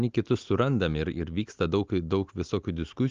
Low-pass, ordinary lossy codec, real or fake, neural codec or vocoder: 7.2 kHz; Opus, 32 kbps; real; none